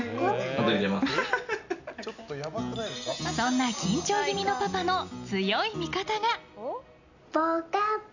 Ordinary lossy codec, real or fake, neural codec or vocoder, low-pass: none; real; none; 7.2 kHz